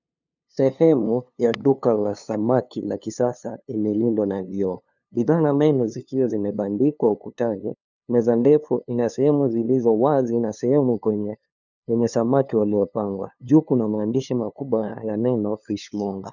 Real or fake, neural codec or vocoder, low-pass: fake; codec, 16 kHz, 2 kbps, FunCodec, trained on LibriTTS, 25 frames a second; 7.2 kHz